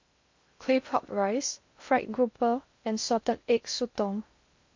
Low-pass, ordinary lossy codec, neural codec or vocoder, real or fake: 7.2 kHz; MP3, 48 kbps; codec, 16 kHz in and 24 kHz out, 0.6 kbps, FocalCodec, streaming, 2048 codes; fake